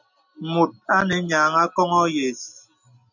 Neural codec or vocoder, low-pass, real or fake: none; 7.2 kHz; real